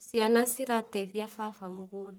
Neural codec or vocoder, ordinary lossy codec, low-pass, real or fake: codec, 44.1 kHz, 1.7 kbps, Pupu-Codec; none; none; fake